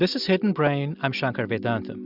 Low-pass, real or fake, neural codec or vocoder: 5.4 kHz; real; none